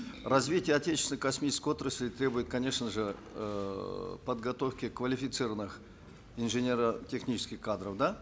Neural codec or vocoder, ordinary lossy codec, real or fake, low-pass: none; none; real; none